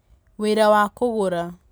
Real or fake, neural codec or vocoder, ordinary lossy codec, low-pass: real; none; none; none